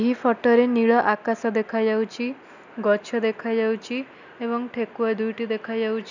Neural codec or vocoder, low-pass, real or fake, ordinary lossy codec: none; 7.2 kHz; real; none